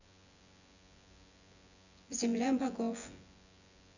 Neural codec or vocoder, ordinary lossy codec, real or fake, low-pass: vocoder, 24 kHz, 100 mel bands, Vocos; none; fake; 7.2 kHz